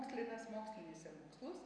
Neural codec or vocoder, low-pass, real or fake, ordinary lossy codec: none; 9.9 kHz; real; MP3, 96 kbps